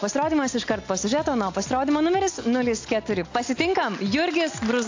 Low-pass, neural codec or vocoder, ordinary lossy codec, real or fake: 7.2 kHz; none; AAC, 48 kbps; real